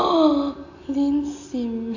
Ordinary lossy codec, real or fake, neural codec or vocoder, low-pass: AAC, 48 kbps; real; none; 7.2 kHz